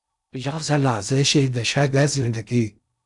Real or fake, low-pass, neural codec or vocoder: fake; 10.8 kHz; codec, 16 kHz in and 24 kHz out, 0.6 kbps, FocalCodec, streaming, 2048 codes